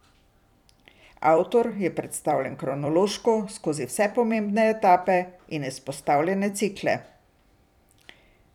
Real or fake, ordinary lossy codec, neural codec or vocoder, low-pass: fake; none; vocoder, 44.1 kHz, 128 mel bands every 256 samples, BigVGAN v2; 19.8 kHz